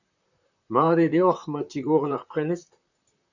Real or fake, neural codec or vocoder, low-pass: fake; vocoder, 44.1 kHz, 128 mel bands, Pupu-Vocoder; 7.2 kHz